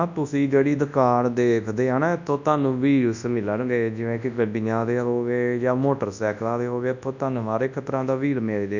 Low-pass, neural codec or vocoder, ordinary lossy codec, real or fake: 7.2 kHz; codec, 24 kHz, 0.9 kbps, WavTokenizer, large speech release; none; fake